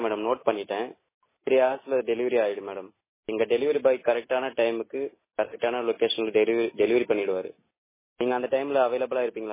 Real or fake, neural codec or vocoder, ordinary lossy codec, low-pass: real; none; MP3, 16 kbps; 3.6 kHz